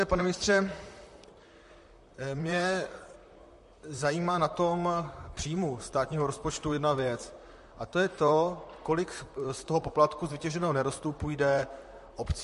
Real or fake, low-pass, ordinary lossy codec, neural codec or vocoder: fake; 14.4 kHz; MP3, 48 kbps; vocoder, 44.1 kHz, 128 mel bands, Pupu-Vocoder